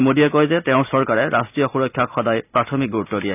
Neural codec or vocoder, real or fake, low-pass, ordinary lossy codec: none; real; 3.6 kHz; none